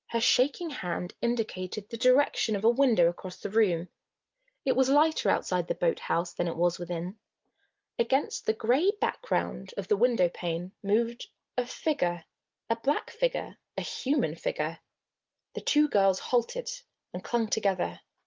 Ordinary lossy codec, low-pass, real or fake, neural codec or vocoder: Opus, 24 kbps; 7.2 kHz; real; none